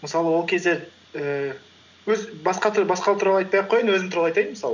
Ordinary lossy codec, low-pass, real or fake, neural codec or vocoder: none; 7.2 kHz; real; none